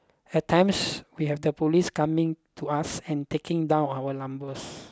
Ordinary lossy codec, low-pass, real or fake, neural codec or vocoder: none; none; real; none